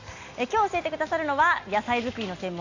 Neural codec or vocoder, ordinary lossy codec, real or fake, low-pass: none; AAC, 48 kbps; real; 7.2 kHz